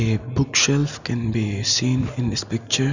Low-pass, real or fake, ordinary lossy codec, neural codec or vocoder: 7.2 kHz; real; none; none